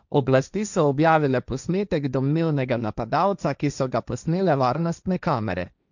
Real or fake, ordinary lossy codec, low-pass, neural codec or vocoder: fake; none; 7.2 kHz; codec, 16 kHz, 1.1 kbps, Voila-Tokenizer